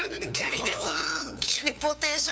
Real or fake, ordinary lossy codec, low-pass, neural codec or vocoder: fake; none; none; codec, 16 kHz, 2 kbps, FunCodec, trained on LibriTTS, 25 frames a second